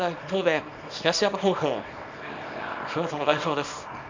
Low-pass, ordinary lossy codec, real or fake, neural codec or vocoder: 7.2 kHz; MP3, 64 kbps; fake; codec, 24 kHz, 0.9 kbps, WavTokenizer, small release